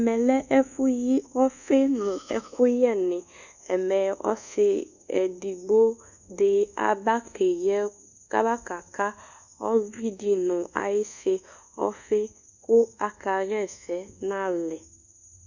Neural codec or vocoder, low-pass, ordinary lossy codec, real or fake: codec, 24 kHz, 1.2 kbps, DualCodec; 7.2 kHz; Opus, 64 kbps; fake